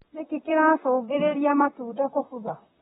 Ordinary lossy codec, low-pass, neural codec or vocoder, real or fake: AAC, 16 kbps; 19.8 kHz; vocoder, 44.1 kHz, 128 mel bands, Pupu-Vocoder; fake